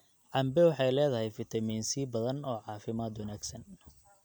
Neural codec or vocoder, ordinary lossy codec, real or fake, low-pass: none; none; real; none